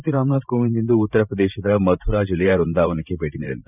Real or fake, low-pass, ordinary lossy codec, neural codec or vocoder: fake; 3.6 kHz; none; vocoder, 44.1 kHz, 128 mel bands every 512 samples, BigVGAN v2